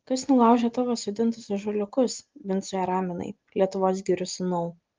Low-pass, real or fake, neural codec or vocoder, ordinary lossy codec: 7.2 kHz; real; none; Opus, 32 kbps